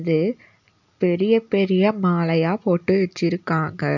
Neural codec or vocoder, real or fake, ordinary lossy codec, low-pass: none; real; none; 7.2 kHz